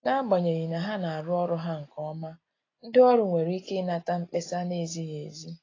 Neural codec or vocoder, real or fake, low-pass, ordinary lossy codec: none; real; 7.2 kHz; AAC, 32 kbps